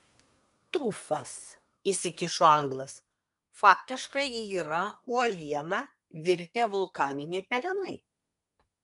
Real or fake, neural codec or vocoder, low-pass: fake; codec, 24 kHz, 1 kbps, SNAC; 10.8 kHz